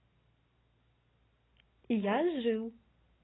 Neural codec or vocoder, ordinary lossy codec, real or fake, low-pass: none; AAC, 16 kbps; real; 7.2 kHz